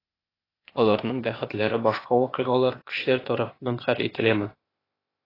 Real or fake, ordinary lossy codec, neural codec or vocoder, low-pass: fake; AAC, 24 kbps; codec, 16 kHz, 0.8 kbps, ZipCodec; 5.4 kHz